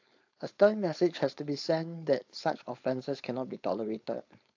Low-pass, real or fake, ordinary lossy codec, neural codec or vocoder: 7.2 kHz; fake; AAC, 48 kbps; codec, 16 kHz, 4.8 kbps, FACodec